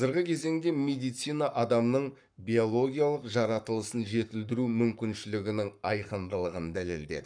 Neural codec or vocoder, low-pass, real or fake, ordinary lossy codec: codec, 44.1 kHz, 7.8 kbps, Pupu-Codec; 9.9 kHz; fake; none